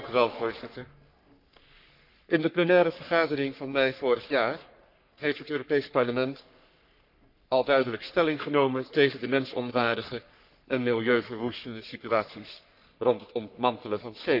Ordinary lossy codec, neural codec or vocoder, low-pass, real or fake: none; codec, 44.1 kHz, 3.4 kbps, Pupu-Codec; 5.4 kHz; fake